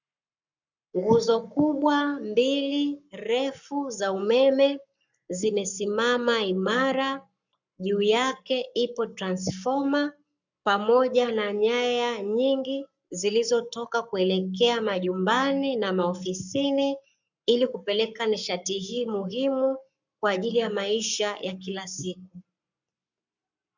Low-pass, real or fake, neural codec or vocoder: 7.2 kHz; fake; codec, 44.1 kHz, 7.8 kbps, Pupu-Codec